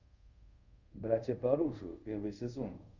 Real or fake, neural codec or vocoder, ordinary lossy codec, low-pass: fake; codec, 24 kHz, 0.5 kbps, DualCodec; Opus, 64 kbps; 7.2 kHz